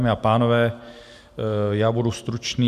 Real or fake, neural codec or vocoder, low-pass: real; none; 14.4 kHz